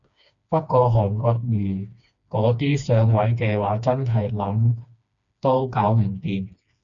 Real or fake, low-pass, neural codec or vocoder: fake; 7.2 kHz; codec, 16 kHz, 2 kbps, FreqCodec, smaller model